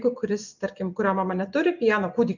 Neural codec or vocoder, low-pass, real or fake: none; 7.2 kHz; real